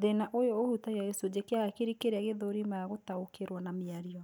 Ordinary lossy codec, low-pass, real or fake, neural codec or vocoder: none; none; real; none